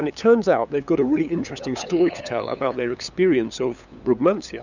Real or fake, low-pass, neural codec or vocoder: fake; 7.2 kHz; codec, 16 kHz, 8 kbps, FunCodec, trained on LibriTTS, 25 frames a second